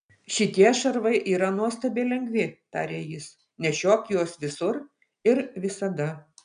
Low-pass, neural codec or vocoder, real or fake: 9.9 kHz; none; real